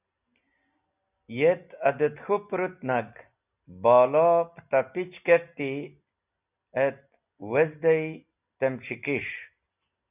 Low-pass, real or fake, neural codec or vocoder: 3.6 kHz; real; none